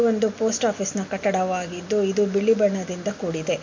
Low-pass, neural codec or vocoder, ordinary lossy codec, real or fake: 7.2 kHz; none; MP3, 48 kbps; real